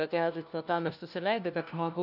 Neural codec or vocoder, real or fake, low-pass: codec, 16 kHz, 1 kbps, FunCodec, trained on LibriTTS, 50 frames a second; fake; 5.4 kHz